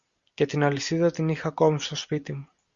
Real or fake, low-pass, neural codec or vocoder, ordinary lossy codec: real; 7.2 kHz; none; Opus, 64 kbps